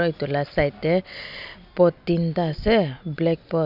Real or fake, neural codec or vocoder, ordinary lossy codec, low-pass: real; none; none; 5.4 kHz